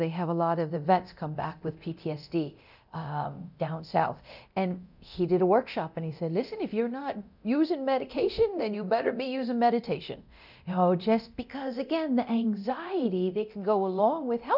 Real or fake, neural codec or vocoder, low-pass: fake; codec, 24 kHz, 0.9 kbps, DualCodec; 5.4 kHz